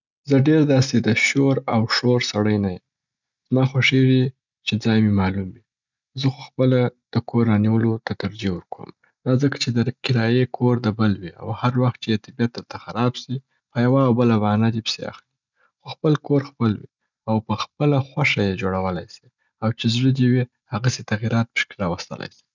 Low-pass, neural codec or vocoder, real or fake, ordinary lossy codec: 7.2 kHz; none; real; none